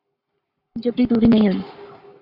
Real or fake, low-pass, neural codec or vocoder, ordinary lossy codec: fake; 5.4 kHz; codec, 44.1 kHz, 7.8 kbps, Pupu-Codec; Opus, 64 kbps